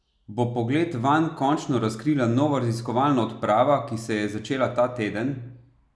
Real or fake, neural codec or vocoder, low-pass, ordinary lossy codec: real; none; none; none